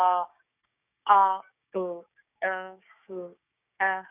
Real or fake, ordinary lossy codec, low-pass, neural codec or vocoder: fake; none; 3.6 kHz; codec, 16 kHz, 6 kbps, DAC